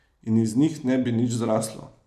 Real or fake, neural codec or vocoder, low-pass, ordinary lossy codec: fake; vocoder, 44.1 kHz, 128 mel bands every 256 samples, BigVGAN v2; 14.4 kHz; none